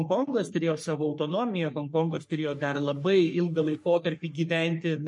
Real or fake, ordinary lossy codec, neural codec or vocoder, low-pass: fake; MP3, 48 kbps; codec, 44.1 kHz, 3.4 kbps, Pupu-Codec; 10.8 kHz